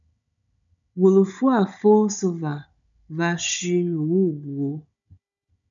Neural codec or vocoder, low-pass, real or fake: codec, 16 kHz, 16 kbps, FunCodec, trained on Chinese and English, 50 frames a second; 7.2 kHz; fake